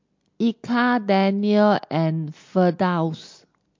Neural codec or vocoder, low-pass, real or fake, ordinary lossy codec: none; 7.2 kHz; real; MP3, 48 kbps